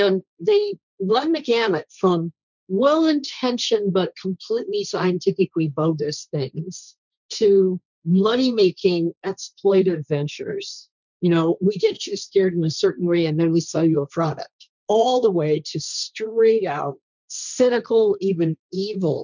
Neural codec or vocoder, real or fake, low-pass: codec, 16 kHz, 1.1 kbps, Voila-Tokenizer; fake; 7.2 kHz